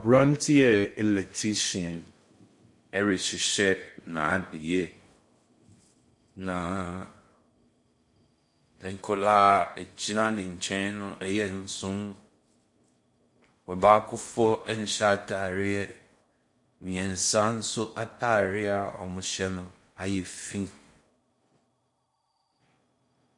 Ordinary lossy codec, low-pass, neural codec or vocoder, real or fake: MP3, 48 kbps; 10.8 kHz; codec, 16 kHz in and 24 kHz out, 0.6 kbps, FocalCodec, streaming, 2048 codes; fake